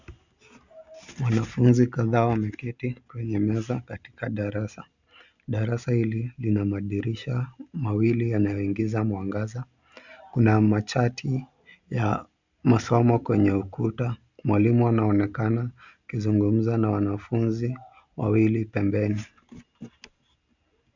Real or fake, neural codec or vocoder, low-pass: real; none; 7.2 kHz